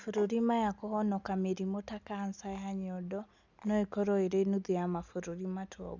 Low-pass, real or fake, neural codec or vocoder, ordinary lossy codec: 7.2 kHz; real; none; Opus, 64 kbps